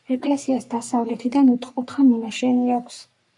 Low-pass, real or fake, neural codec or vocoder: 10.8 kHz; fake; codec, 44.1 kHz, 3.4 kbps, Pupu-Codec